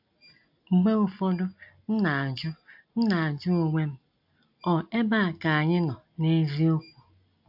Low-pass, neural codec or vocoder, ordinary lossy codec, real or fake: 5.4 kHz; none; AAC, 48 kbps; real